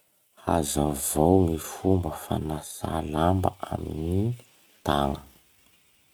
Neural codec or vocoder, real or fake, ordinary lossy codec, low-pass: none; real; none; none